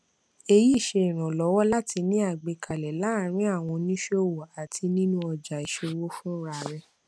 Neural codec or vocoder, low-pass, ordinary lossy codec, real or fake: none; none; none; real